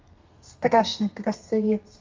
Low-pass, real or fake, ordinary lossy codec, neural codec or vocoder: 7.2 kHz; fake; Opus, 32 kbps; codec, 32 kHz, 1.9 kbps, SNAC